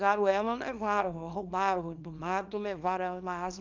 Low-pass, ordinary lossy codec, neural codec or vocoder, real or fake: 7.2 kHz; Opus, 24 kbps; codec, 24 kHz, 0.9 kbps, WavTokenizer, small release; fake